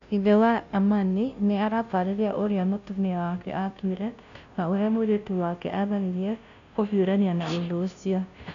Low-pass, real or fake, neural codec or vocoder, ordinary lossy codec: 7.2 kHz; fake; codec, 16 kHz, 0.5 kbps, FunCodec, trained on Chinese and English, 25 frames a second; none